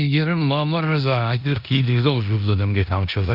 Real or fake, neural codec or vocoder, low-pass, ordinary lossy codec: fake; codec, 16 kHz in and 24 kHz out, 0.9 kbps, LongCat-Audio-Codec, four codebook decoder; 5.4 kHz; Opus, 64 kbps